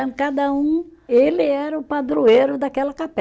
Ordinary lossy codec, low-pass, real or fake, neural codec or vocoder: none; none; real; none